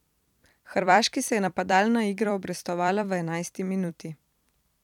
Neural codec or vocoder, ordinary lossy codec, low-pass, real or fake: vocoder, 44.1 kHz, 128 mel bands every 256 samples, BigVGAN v2; none; 19.8 kHz; fake